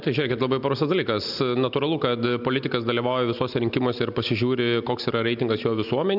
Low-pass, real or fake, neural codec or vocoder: 5.4 kHz; real; none